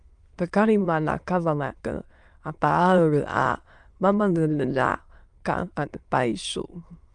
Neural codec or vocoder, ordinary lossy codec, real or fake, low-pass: autoencoder, 22.05 kHz, a latent of 192 numbers a frame, VITS, trained on many speakers; Opus, 32 kbps; fake; 9.9 kHz